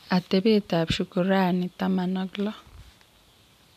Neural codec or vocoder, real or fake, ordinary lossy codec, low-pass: none; real; none; 14.4 kHz